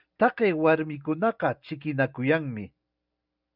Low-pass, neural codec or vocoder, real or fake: 5.4 kHz; none; real